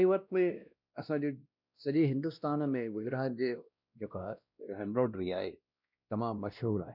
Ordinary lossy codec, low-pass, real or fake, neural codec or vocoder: none; 5.4 kHz; fake; codec, 16 kHz, 1 kbps, X-Codec, WavLM features, trained on Multilingual LibriSpeech